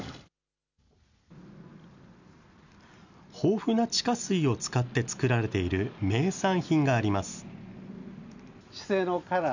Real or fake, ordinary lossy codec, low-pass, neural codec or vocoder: real; none; 7.2 kHz; none